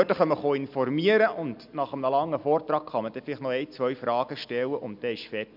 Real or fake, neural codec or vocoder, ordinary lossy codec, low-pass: real; none; none; 5.4 kHz